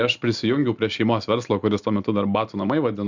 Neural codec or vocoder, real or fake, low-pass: none; real; 7.2 kHz